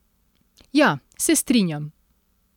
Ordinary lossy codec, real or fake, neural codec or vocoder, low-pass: none; real; none; 19.8 kHz